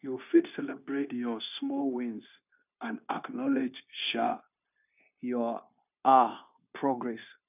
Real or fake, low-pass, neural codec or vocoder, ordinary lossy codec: fake; 3.6 kHz; codec, 16 kHz, 0.9 kbps, LongCat-Audio-Codec; none